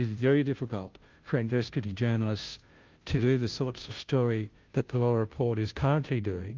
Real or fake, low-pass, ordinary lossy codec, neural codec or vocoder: fake; 7.2 kHz; Opus, 24 kbps; codec, 16 kHz, 0.5 kbps, FunCodec, trained on Chinese and English, 25 frames a second